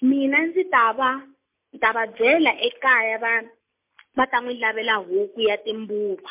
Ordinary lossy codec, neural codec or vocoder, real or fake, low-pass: MP3, 32 kbps; none; real; 3.6 kHz